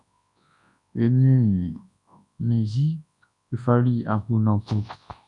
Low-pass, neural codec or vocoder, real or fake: 10.8 kHz; codec, 24 kHz, 0.9 kbps, WavTokenizer, large speech release; fake